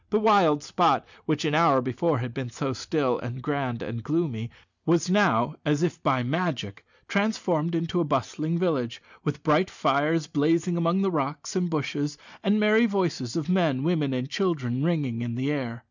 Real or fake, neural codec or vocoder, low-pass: real; none; 7.2 kHz